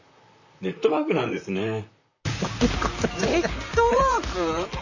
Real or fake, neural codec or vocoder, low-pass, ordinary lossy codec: fake; vocoder, 44.1 kHz, 128 mel bands, Pupu-Vocoder; 7.2 kHz; none